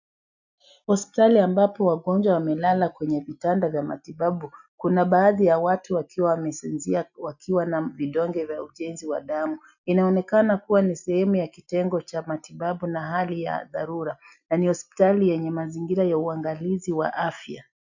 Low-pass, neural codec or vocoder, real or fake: 7.2 kHz; none; real